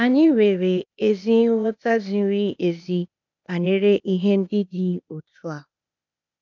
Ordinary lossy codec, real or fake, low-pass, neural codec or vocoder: none; fake; 7.2 kHz; codec, 16 kHz, 0.8 kbps, ZipCodec